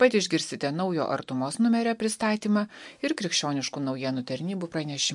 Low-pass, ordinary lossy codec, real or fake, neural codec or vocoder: 10.8 kHz; MP3, 64 kbps; real; none